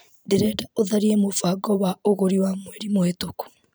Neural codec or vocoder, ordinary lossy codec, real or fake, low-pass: vocoder, 44.1 kHz, 128 mel bands every 512 samples, BigVGAN v2; none; fake; none